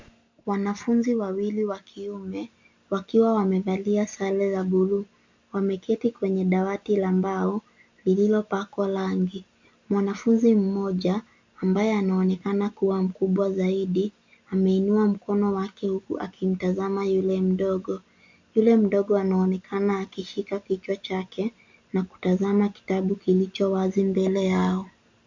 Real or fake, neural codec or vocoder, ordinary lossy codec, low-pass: real; none; MP3, 64 kbps; 7.2 kHz